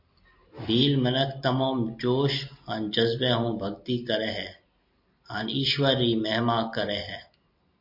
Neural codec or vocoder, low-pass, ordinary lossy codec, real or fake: none; 5.4 kHz; MP3, 32 kbps; real